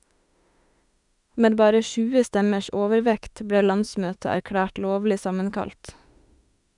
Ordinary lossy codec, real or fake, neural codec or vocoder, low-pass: none; fake; autoencoder, 48 kHz, 32 numbers a frame, DAC-VAE, trained on Japanese speech; 10.8 kHz